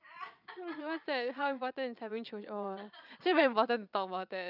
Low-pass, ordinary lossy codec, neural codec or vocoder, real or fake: 5.4 kHz; none; vocoder, 22.05 kHz, 80 mel bands, Vocos; fake